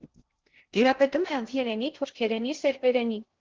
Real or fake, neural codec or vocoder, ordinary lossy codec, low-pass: fake; codec, 16 kHz in and 24 kHz out, 0.6 kbps, FocalCodec, streaming, 2048 codes; Opus, 16 kbps; 7.2 kHz